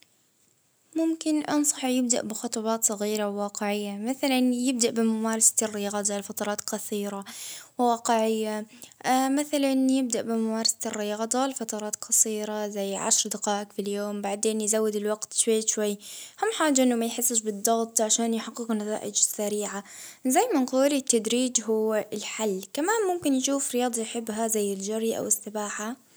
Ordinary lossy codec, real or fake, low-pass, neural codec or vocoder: none; real; none; none